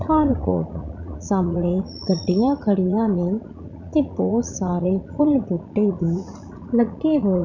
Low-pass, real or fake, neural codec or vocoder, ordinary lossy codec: 7.2 kHz; fake; vocoder, 44.1 kHz, 80 mel bands, Vocos; none